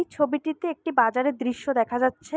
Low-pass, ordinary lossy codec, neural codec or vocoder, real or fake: none; none; none; real